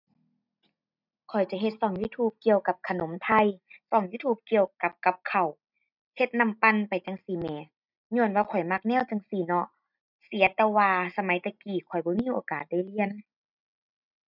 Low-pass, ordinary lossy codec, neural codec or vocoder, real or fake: 5.4 kHz; none; none; real